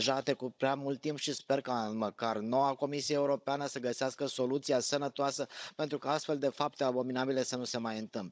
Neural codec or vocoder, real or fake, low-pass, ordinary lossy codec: codec, 16 kHz, 16 kbps, FunCodec, trained on Chinese and English, 50 frames a second; fake; none; none